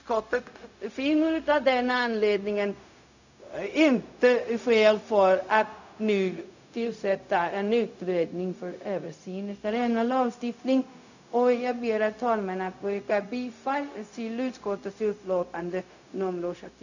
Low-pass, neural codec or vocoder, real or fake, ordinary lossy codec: 7.2 kHz; codec, 16 kHz, 0.4 kbps, LongCat-Audio-Codec; fake; none